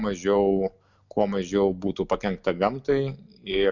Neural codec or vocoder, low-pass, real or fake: none; 7.2 kHz; real